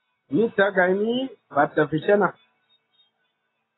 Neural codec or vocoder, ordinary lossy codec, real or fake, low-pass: none; AAC, 16 kbps; real; 7.2 kHz